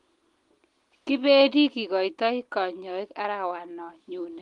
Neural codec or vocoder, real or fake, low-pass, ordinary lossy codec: none; real; 10.8 kHz; Opus, 24 kbps